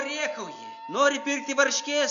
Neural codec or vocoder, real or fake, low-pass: none; real; 7.2 kHz